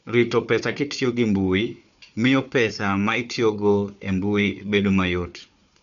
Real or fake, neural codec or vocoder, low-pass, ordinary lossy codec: fake; codec, 16 kHz, 4 kbps, FunCodec, trained on Chinese and English, 50 frames a second; 7.2 kHz; none